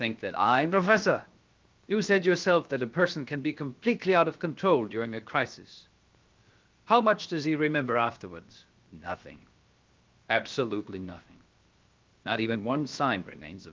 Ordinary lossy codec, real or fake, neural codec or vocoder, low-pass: Opus, 24 kbps; fake; codec, 16 kHz, 0.7 kbps, FocalCodec; 7.2 kHz